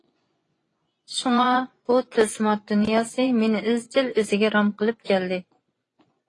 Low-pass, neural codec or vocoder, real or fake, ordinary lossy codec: 10.8 kHz; vocoder, 24 kHz, 100 mel bands, Vocos; fake; AAC, 32 kbps